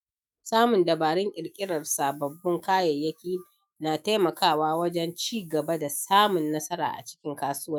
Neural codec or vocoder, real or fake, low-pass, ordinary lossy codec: autoencoder, 48 kHz, 128 numbers a frame, DAC-VAE, trained on Japanese speech; fake; none; none